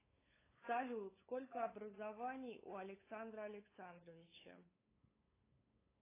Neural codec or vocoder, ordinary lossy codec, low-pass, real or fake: codec, 16 kHz, 8 kbps, FunCodec, trained on LibriTTS, 25 frames a second; AAC, 16 kbps; 3.6 kHz; fake